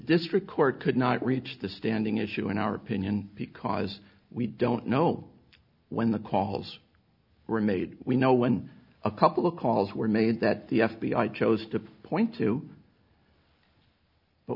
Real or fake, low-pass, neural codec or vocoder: real; 5.4 kHz; none